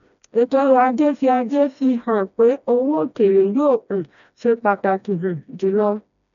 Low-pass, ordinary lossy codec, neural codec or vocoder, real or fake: 7.2 kHz; MP3, 96 kbps; codec, 16 kHz, 1 kbps, FreqCodec, smaller model; fake